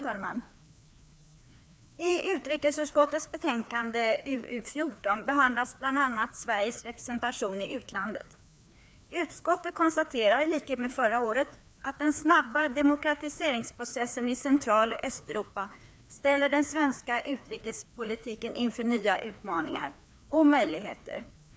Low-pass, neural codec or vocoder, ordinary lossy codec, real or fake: none; codec, 16 kHz, 2 kbps, FreqCodec, larger model; none; fake